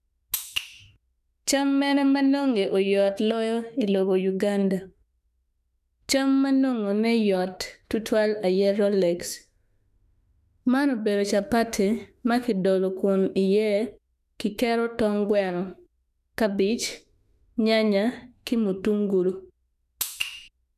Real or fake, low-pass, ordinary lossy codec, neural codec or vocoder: fake; 14.4 kHz; none; autoencoder, 48 kHz, 32 numbers a frame, DAC-VAE, trained on Japanese speech